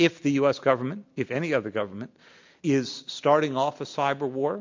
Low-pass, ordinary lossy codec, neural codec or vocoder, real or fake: 7.2 kHz; MP3, 48 kbps; none; real